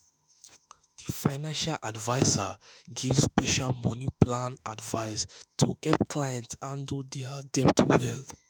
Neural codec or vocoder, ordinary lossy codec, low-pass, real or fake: autoencoder, 48 kHz, 32 numbers a frame, DAC-VAE, trained on Japanese speech; none; none; fake